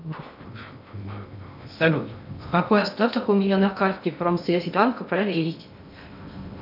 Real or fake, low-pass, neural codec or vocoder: fake; 5.4 kHz; codec, 16 kHz in and 24 kHz out, 0.6 kbps, FocalCodec, streaming, 2048 codes